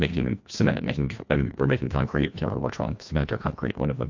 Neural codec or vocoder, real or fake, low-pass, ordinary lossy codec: codec, 16 kHz, 1 kbps, FreqCodec, larger model; fake; 7.2 kHz; MP3, 48 kbps